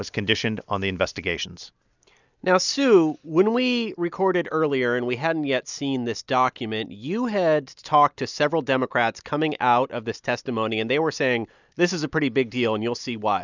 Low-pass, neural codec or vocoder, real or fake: 7.2 kHz; none; real